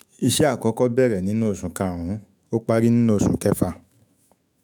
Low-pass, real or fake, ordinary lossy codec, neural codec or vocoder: none; fake; none; autoencoder, 48 kHz, 128 numbers a frame, DAC-VAE, trained on Japanese speech